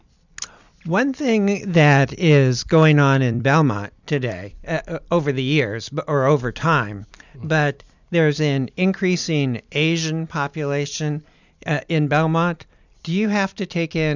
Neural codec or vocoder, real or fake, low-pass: vocoder, 44.1 kHz, 128 mel bands every 256 samples, BigVGAN v2; fake; 7.2 kHz